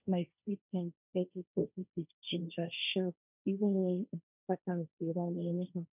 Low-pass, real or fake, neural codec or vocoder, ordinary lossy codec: 3.6 kHz; fake; codec, 16 kHz, 1.1 kbps, Voila-Tokenizer; none